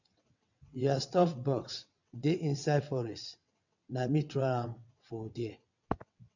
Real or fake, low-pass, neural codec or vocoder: fake; 7.2 kHz; vocoder, 22.05 kHz, 80 mel bands, WaveNeXt